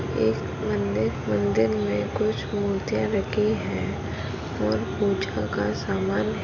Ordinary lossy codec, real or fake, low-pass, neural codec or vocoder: none; real; 7.2 kHz; none